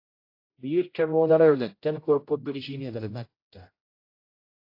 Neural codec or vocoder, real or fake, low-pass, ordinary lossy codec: codec, 16 kHz, 0.5 kbps, X-Codec, HuBERT features, trained on general audio; fake; 5.4 kHz; AAC, 32 kbps